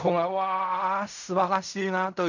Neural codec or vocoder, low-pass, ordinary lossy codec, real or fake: codec, 16 kHz in and 24 kHz out, 0.4 kbps, LongCat-Audio-Codec, fine tuned four codebook decoder; 7.2 kHz; none; fake